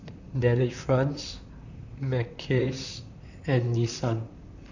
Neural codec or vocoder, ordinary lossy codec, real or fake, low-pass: vocoder, 44.1 kHz, 128 mel bands, Pupu-Vocoder; none; fake; 7.2 kHz